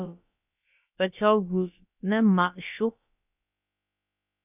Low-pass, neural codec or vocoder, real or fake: 3.6 kHz; codec, 16 kHz, about 1 kbps, DyCAST, with the encoder's durations; fake